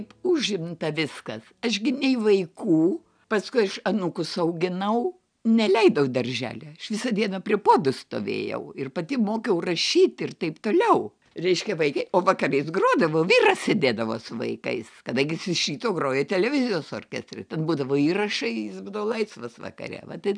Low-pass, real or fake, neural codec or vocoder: 9.9 kHz; real; none